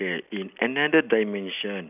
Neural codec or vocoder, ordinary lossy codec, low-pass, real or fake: none; none; 3.6 kHz; real